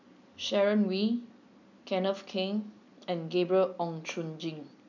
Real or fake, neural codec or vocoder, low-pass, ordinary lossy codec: real; none; 7.2 kHz; none